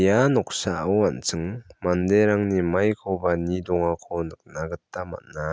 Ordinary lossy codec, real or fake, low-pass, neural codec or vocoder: none; real; none; none